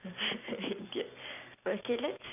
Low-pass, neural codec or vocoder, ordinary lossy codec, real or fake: 3.6 kHz; none; none; real